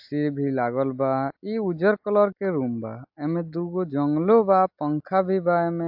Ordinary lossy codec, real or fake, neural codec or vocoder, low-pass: AAC, 48 kbps; real; none; 5.4 kHz